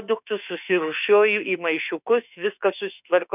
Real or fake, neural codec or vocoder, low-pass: fake; autoencoder, 48 kHz, 32 numbers a frame, DAC-VAE, trained on Japanese speech; 3.6 kHz